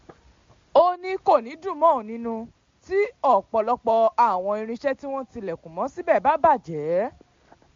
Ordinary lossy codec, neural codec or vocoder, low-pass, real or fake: MP3, 48 kbps; none; 7.2 kHz; real